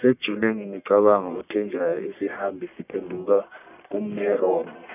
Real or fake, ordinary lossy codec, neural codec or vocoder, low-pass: fake; none; codec, 44.1 kHz, 1.7 kbps, Pupu-Codec; 3.6 kHz